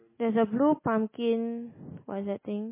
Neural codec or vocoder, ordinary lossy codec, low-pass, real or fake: none; MP3, 16 kbps; 3.6 kHz; real